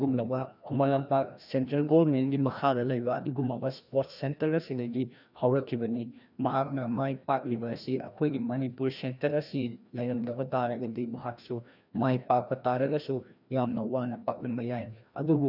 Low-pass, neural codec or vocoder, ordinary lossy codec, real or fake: 5.4 kHz; codec, 16 kHz, 1 kbps, FreqCodec, larger model; none; fake